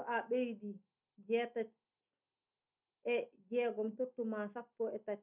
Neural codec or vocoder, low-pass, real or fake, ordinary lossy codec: none; 3.6 kHz; real; none